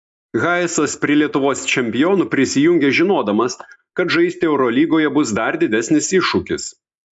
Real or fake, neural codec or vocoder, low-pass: real; none; 10.8 kHz